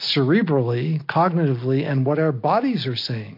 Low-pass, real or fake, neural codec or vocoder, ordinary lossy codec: 5.4 kHz; real; none; MP3, 32 kbps